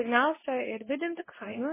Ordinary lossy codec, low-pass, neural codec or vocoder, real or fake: MP3, 16 kbps; 3.6 kHz; codec, 24 kHz, 0.9 kbps, WavTokenizer, medium speech release version 2; fake